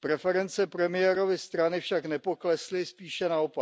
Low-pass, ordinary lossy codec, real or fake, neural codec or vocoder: none; none; real; none